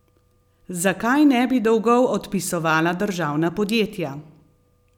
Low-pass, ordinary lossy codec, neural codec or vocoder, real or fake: 19.8 kHz; none; none; real